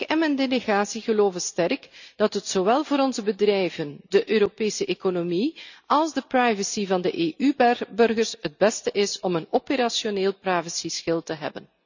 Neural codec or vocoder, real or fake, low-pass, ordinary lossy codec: none; real; 7.2 kHz; none